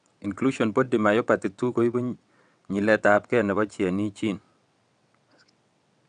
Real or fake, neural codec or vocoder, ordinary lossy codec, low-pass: fake; vocoder, 22.05 kHz, 80 mel bands, WaveNeXt; none; 9.9 kHz